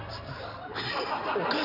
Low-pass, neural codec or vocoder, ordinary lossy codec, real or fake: 5.4 kHz; none; none; real